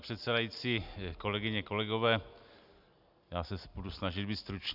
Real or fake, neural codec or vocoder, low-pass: real; none; 5.4 kHz